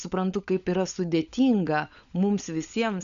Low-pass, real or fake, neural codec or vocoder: 7.2 kHz; fake; codec, 16 kHz, 16 kbps, FunCodec, trained on LibriTTS, 50 frames a second